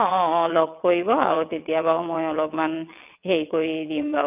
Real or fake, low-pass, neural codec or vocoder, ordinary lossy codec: fake; 3.6 kHz; vocoder, 22.05 kHz, 80 mel bands, WaveNeXt; none